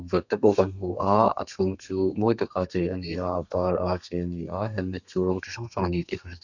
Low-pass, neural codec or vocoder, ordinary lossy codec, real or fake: 7.2 kHz; codec, 32 kHz, 1.9 kbps, SNAC; none; fake